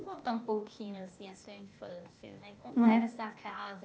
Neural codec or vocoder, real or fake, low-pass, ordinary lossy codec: codec, 16 kHz, 0.8 kbps, ZipCodec; fake; none; none